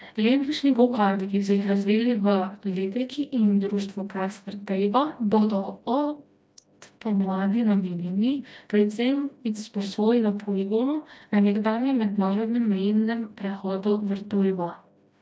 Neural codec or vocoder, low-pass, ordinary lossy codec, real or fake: codec, 16 kHz, 1 kbps, FreqCodec, smaller model; none; none; fake